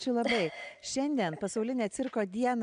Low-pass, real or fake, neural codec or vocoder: 9.9 kHz; real; none